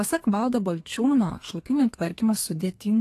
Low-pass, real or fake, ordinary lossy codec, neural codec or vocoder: 14.4 kHz; fake; AAC, 48 kbps; codec, 32 kHz, 1.9 kbps, SNAC